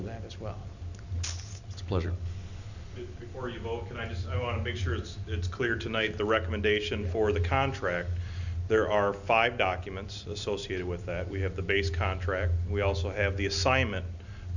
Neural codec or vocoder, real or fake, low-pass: none; real; 7.2 kHz